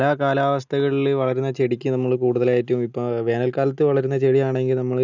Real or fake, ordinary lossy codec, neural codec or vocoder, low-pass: real; none; none; 7.2 kHz